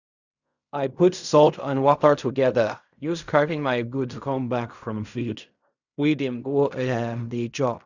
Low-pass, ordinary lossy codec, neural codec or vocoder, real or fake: 7.2 kHz; none; codec, 16 kHz in and 24 kHz out, 0.4 kbps, LongCat-Audio-Codec, fine tuned four codebook decoder; fake